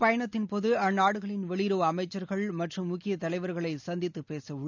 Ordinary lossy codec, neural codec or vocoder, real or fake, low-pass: none; none; real; 7.2 kHz